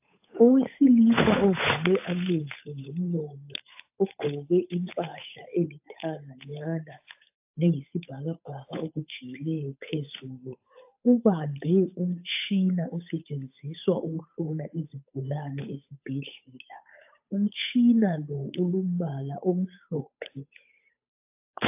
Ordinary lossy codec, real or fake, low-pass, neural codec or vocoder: AAC, 32 kbps; fake; 3.6 kHz; codec, 16 kHz, 8 kbps, FunCodec, trained on Chinese and English, 25 frames a second